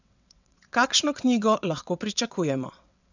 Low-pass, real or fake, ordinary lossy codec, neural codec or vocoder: 7.2 kHz; fake; none; vocoder, 22.05 kHz, 80 mel bands, WaveNeXt